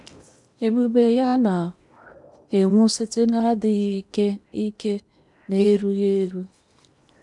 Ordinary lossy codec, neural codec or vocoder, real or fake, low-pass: none; codec, 16 kHz in and 24 kHz out, 0.8 kbps, FocalCodec, streaming, 65536 codes; fake; 10.8 kHz